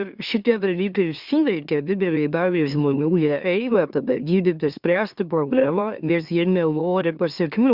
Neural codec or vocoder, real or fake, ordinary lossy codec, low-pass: autoencoder, 44.1 kHz, a latent of 192 numbers a frame, MeloTTS; fake; Opus, 64 kbps; 5.4 kHz